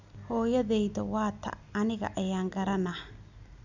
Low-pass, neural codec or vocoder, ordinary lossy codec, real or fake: 7.2 kHz; none; none; real